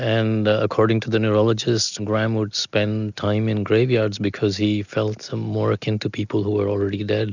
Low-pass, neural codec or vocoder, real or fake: 7.2 kHz; none; real